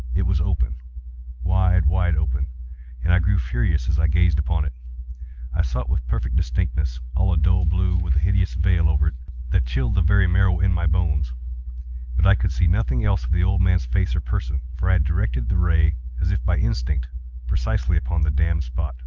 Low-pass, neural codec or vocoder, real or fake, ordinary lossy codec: 7.2 kHz; none; real; Opus, 24 kbps